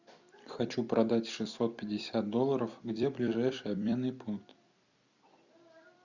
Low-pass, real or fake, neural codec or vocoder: 7.2 kHz; fake; vocoder, 44.1 kHz, 128 mel bands every 256 samples, BigVGAN v2